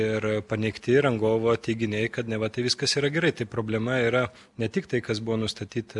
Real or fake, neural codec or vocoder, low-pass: real; none; 10.8 kHz